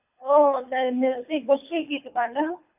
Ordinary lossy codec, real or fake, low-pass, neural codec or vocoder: none; fake; 3.6 kHz; codec, 24 kHz, 3 kbps, HILCodec